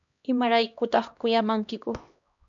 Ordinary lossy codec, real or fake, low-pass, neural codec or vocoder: MP3, 64 kbps; fake; 7.2 kHz; codec, 16 kHz, 1 kbps, X-Codec, HuBERT features, trained on LibriSpeech